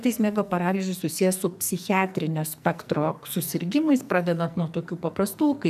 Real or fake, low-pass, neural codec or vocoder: fake; 14.4 kHz; codec, 44.1 kHz, 2.6 kbps, SNAC